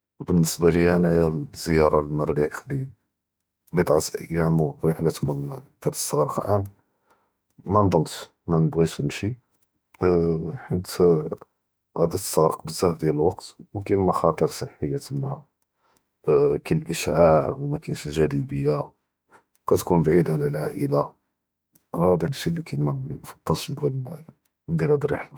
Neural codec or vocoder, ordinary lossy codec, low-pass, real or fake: autoencoder, 48 kHz, 32 numbers a frame, DAC-VAE, trained on Japanese speech; none; none; fake